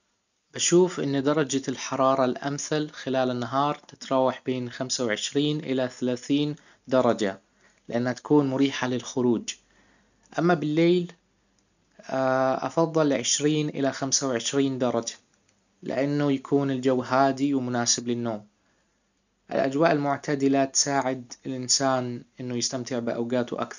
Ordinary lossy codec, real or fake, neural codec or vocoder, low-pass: none; real; none; 7.2 kHz